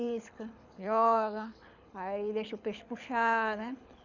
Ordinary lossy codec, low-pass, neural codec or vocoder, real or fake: none; 7.2 kHz; codec, 24 kHz, 6 kbps, HILCodec; fake